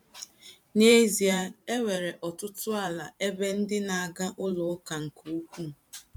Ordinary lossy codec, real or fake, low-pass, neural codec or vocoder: MP3, 96 kbps; fake; 19.8 kHz; vocoder, 44.1 kHz, 128 mel bands every 512 samples, BigVGAN v2